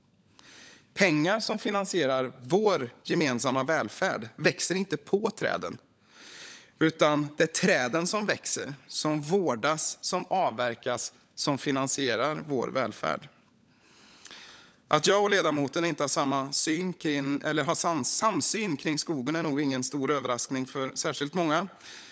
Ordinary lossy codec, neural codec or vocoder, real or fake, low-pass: none; codec, 16 kHz, 16 kbps, FunCodec, trained on LibriTTS, 50 frames a second; fake; none